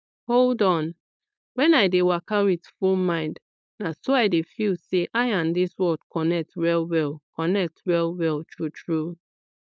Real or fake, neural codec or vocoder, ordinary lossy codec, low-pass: fake; codec, 16 kHz, 4.8 kbps, FACodec; none; none